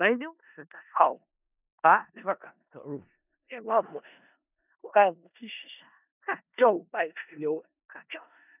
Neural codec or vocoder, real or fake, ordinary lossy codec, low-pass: codec, 16 kHz in and 24 kHz out, 0.4 kbps, LongCat-Audio-Codec, four codebook decoder; fake; none; 3.6 kHz